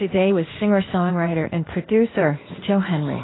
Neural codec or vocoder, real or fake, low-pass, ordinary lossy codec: codec, 16 kHz, 0.8 kbps, ZipCodec; fake; 7.2 kHz; AAC, 16 kbps